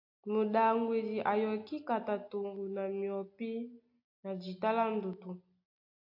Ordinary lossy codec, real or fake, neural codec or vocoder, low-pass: AAC, 48 kbps; real; none; 5.4 kHz